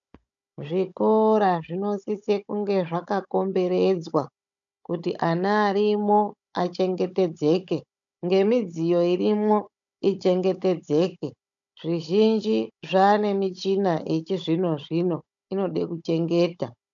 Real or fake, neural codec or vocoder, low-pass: fake; codec, 16 kHz, 16 kbps, FunCodec, trained on Chinese and English, 50 frames a second; 7.2 kHz